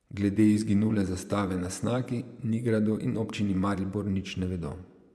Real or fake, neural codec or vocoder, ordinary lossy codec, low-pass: fake; vocoder, 24 kHz, 100 mel bands, Vocos; none; none